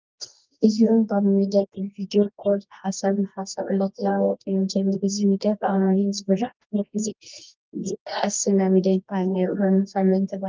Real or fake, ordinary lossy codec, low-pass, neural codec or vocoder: fake; Opus, 32 kbps; 7.2 kHz; codec, 24 kHz, 0.9 kbps, WavTokenizer, medium music audio release